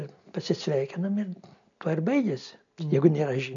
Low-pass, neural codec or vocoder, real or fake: 7.2 kHz; none; real